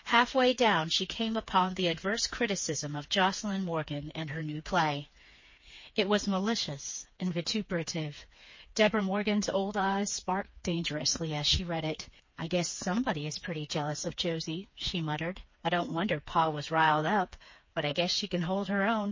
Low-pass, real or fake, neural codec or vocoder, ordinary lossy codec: 7.2 kHz; fake; codec, 16 kHz, 4 kbps, FreqCodec, smaller model; MP3, 32 kbps